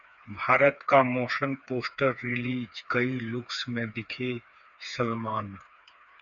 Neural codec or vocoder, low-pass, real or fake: codec, 16 kHz, 4 kbps, FreqCodec, smaller model; 7.2 kHz; fake